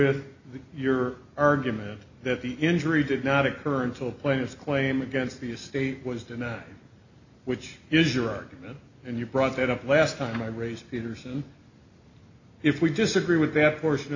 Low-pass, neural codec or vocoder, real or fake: 7.2 kHz; none; real